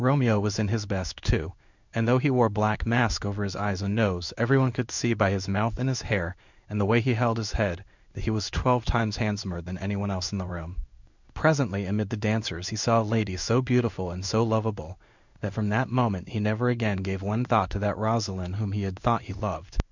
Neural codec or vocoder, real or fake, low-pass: codec, 16 kHz in and 24 kHz out, 1 kbps, XY-Tokenizer; fake; 7.2 kHz